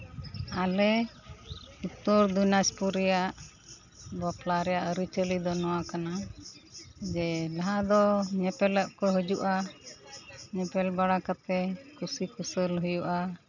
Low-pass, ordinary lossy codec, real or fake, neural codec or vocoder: 7.2 kHz; none; real; none